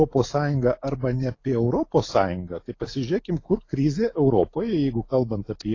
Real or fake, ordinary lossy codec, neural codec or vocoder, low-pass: real; AAC, 32 kbps; none; 7.2 kHz